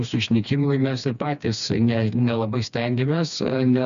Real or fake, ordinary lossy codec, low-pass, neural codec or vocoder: fake; AAC, 96 kbps; 7.2 kHz; codec, 16 kHz, 2 kbps, FreqCodec, smaller model